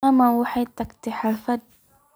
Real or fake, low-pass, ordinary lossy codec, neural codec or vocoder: real; none; none; none